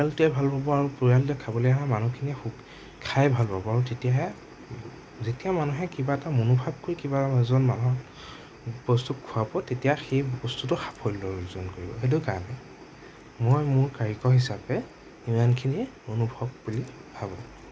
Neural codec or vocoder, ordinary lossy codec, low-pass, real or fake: none; none; none; real